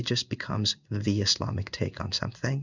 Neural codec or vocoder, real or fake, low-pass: codec, 16 kHz in and 24 kHz out, 1 kbps, XY-Tokenizer; fake; 7.2 kHz